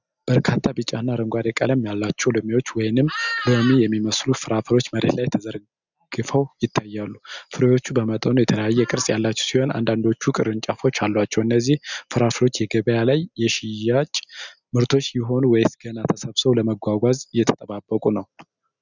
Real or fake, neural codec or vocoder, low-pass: real; none; 7.2 kHz